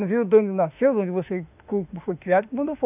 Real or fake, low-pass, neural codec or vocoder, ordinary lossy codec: fake; 3.6 kHz; autoencoder, 48 kHz, 32 numbers a frame, DAC-VAE, trained on Japanese speech; none